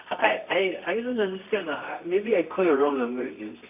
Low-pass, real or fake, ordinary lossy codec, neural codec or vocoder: 3.6 kHz; fake; none; codec, 24 kHz, 0.9 kbps, WavTokenizer, medium music audio release